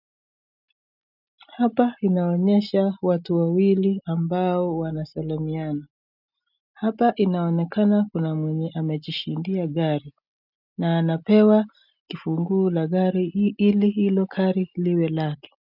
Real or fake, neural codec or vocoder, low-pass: real; none; 5.4 kHz